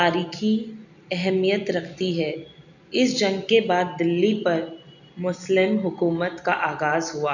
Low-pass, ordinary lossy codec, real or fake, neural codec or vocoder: 7.2 kHz; none; real; none